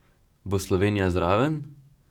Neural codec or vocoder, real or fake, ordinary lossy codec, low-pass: codec, 44.1 kHz, 7.8 kbps, DAC; fake; none; 19.8 kHz